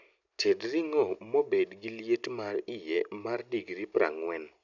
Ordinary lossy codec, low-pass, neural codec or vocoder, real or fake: none; 7.2 kHz; none; real